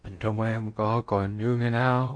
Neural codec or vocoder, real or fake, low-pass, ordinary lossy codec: codec, 16 kHz in and 24 kHz out, 0.8 kbps, FocalCodec, streaming, 65536 codes; fake; 9.9 kHz; MP3, 48 kbps